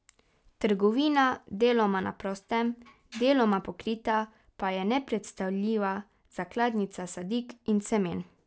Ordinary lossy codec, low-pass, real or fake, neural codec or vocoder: none; none; real; none